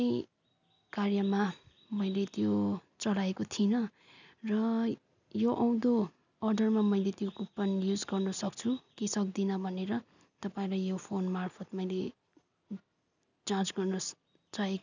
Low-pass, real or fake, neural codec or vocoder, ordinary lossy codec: 7.2 kHz; real; none; none